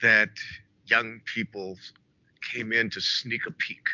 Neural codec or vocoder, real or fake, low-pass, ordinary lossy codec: none; real; 7.2 kHz; MP3, 64 kbps